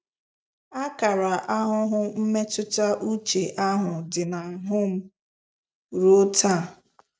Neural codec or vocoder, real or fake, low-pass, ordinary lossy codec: none; real; none; none